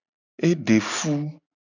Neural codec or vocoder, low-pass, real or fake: none; 7.2 kHz; real